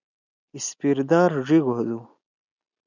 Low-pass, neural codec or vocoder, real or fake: 7.2 kHz; none; real